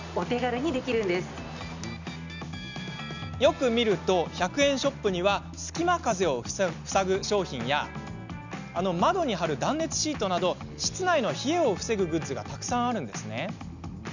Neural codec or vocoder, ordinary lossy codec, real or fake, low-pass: none; none; real; 7.2 kHz